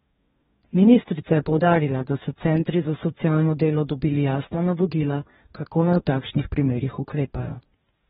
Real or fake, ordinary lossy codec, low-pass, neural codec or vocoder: fake; AAC, 16 kbps; 19.8 kHz; codec, 44.1 kHz, 2.6 kbps, DAC